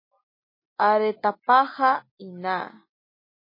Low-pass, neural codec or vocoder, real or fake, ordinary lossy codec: 5.4 kHz; none; real; MP3, 24 kbps